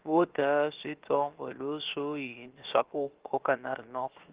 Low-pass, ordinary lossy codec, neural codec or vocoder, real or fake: 3.6 kHz; Opus, 16 kbps; codec, 16 kHz, 0.7 kbps, FocalCodec; fake